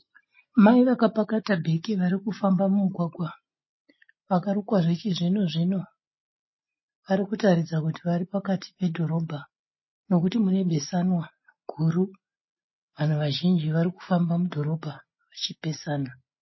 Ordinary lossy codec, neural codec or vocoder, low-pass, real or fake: MP3, 24 kbps; none; 7.2 kHz; real